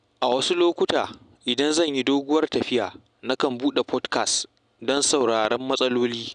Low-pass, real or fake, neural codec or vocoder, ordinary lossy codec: 9.9 kHz; real; none; none